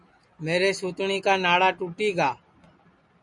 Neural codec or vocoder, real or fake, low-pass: none; real; 10.8 kHz